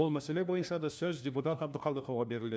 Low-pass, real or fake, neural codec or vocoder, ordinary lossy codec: none; fake; codec, 16 kHz, 1 kbps, FunCodec, trained on LibriTTS, 50 frames a second; none